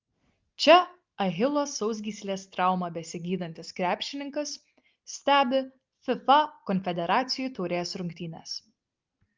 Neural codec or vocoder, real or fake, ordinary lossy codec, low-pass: none; real; Opus, 32 kbps; 7.2 kHz